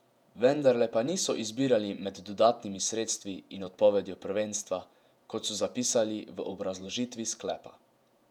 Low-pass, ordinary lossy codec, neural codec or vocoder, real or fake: 19.8 kHz; none; vocoder, 48 kHz, 128 mel bands, Vocos; fake